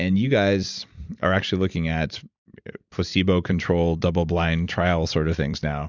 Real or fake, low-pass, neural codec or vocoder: fake; 7.2 kHz; vocoder, 44.1 kHz, 128 mel bands every 256 samples, BigVGAN v2